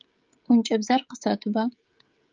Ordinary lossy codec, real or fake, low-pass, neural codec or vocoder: Opus, 24 kbps; fake; 7.2 kHz; codec, 16 kHz, 16 kbps, FreqCodec, smaller model